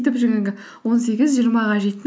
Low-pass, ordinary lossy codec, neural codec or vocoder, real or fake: none; none; none; real